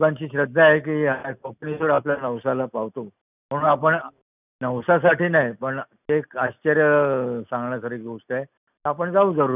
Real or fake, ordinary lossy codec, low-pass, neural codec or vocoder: real; none; 3.6 kHz; none